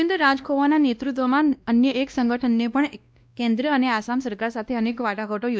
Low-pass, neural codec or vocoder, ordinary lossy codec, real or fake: none; codec, 16 kHz, 1 kbps, X-Codec, WavLM features, trained on Multilingual LibriSpeech; none; fake